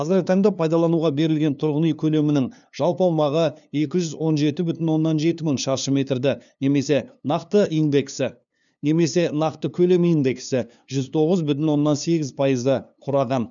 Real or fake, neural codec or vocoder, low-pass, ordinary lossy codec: fake; codec, 16 kHz, 2 kbps, FunCodec, trained on LibriTTS, 25 frames a second; 7.2 kHz; none